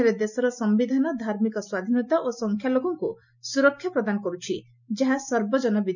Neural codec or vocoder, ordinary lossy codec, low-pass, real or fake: none; none; 7.2 kHz; real